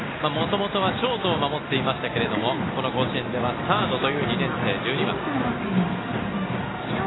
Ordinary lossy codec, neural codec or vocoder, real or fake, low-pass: AAC, 16 kbps; none; real; 7.2 kHz